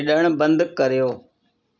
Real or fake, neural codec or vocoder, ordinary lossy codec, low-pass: real; none; none; 7.2 kHz